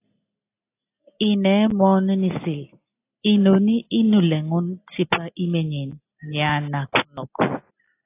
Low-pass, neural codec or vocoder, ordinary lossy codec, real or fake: 3.6 kHz; none; AAC, 24 kbps; real